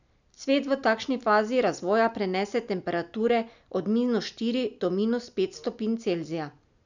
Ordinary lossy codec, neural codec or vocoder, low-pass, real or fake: none; none; 7.2 kHz; real